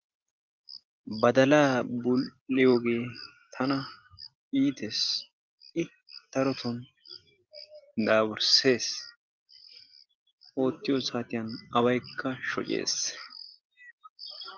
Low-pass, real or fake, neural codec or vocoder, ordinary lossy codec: 7.2 kHz; real; none; Opus, 32 kbps